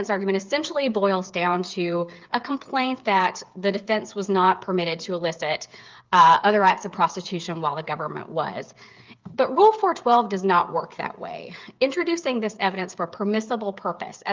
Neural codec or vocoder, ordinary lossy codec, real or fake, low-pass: codec, 16 kHz, 8 kbps, FreqCodec, smaller model; Opus, 32 kbps; fake; 7.2 kHz